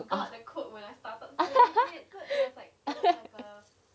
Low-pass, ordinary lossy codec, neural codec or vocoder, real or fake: none; none; none; real